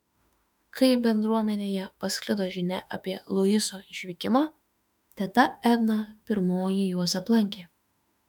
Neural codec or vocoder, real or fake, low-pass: autoencoder, 48 kHz, 32 numbers a frame, DAC-VAE, trained on Japanese speech; fake; 19.8 kHz